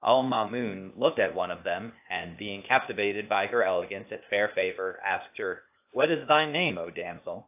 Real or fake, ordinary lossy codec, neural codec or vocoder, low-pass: fake; AAC, 32 kbps; codec, 16 kHz, 0.8 kbps, ZipCodec; 3.6 kHz